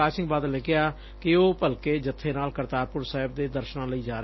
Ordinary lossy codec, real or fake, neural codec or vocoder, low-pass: MP3, 24 kbps; real; none; 7.2 kHz